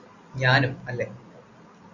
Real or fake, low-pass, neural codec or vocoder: real; 7.2 kHz; none